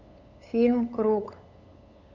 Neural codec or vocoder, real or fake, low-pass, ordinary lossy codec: codec, 16 kHz, 8 kbps, FunCodec, trained on LibriTTS, 25 frames a second; fake; 7.2 kHz; none